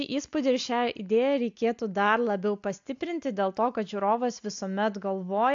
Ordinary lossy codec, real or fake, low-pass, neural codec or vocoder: AAC, 64 kbps; real; 7.2 kHz; none